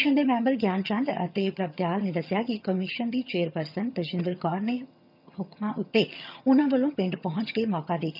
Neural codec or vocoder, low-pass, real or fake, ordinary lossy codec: vocoder, 22.05 kHz, 80 mel bands, HiFi-GAN; 5.4 kHz; fake; none